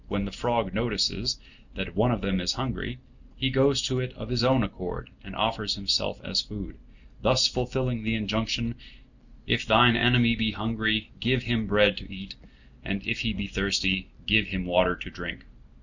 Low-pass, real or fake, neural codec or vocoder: 7.2 kHz; real; none